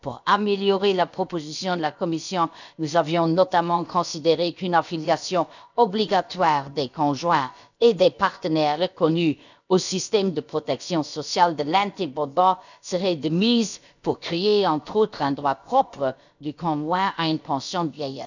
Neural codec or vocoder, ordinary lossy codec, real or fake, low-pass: codec, 16 kHz, about 1 kbps, DyCAST, with the encoder's durations; none; fake; 7.2 kHz